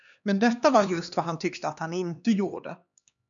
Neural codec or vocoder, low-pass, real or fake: codec, 16 kHz, 2 kbps, X-Codec, HuBERT features, trained on LibriSpeech; 7.2 kHz; fake